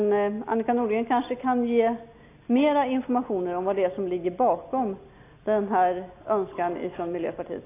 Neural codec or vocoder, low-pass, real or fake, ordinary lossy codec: none; 3.6 kHz; real; AAC, 24 kbps